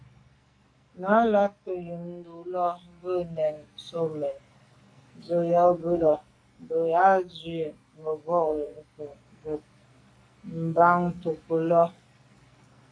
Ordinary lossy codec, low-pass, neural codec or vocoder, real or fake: AAC, 64 kbps; 9.9 kHz; codec, 44.1 kHz, 2.6 kbps, SNAC; fake